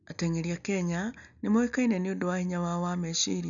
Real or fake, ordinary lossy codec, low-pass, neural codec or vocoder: real; none; 7.2 kHz; none